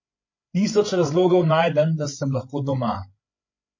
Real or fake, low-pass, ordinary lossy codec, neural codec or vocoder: fake; 7.2 kHz; MP3, 32 kbps; codec, 16 kHz, 8 kbps, FreqCodec, larger model